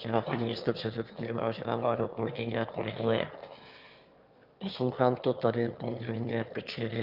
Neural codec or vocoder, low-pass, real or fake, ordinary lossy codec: autoencoder, 22.05 kHz, a latent of 192 numbers a frame, VITS, trained on one speaker; 5.4 kHz; fake; Opus, 24 kbps